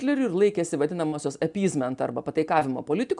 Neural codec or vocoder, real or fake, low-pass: none; real; 10.8 kHz